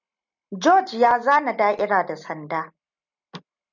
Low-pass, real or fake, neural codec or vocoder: 7.2 kHz; real; none